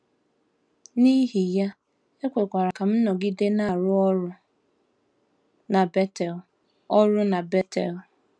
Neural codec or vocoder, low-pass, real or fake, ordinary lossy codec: none; 9.9 kHz; real; none